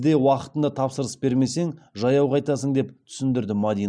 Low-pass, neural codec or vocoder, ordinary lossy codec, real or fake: 9.9 kHz; none; none; real